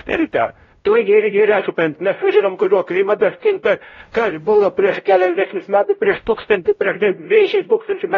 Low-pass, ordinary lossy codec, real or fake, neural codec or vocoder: 7.2 kHz; AAC, 32 kbps; fake; codec, 16 kHz, 0.5 kbps, X-Codec, WavLM features, trained on Multilingual LibriSpeech